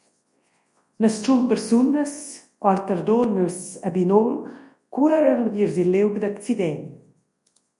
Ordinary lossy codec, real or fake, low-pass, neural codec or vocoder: MP3, 48 kbps; fake; 10.8 kHz; codec, 24 kHz, 0.9 kbps, WavTokenizer, large speech release